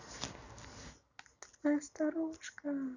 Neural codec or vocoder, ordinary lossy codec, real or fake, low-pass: none; none; real; 7.2 kHz